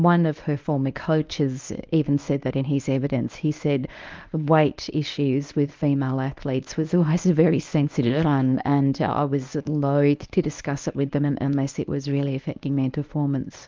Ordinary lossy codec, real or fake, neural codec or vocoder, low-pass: Opus, 32 kbps; fake; codec, 24 kHz, 0.9 kbps, WavTokenizer, medium speech release version 1; 7.2 kHz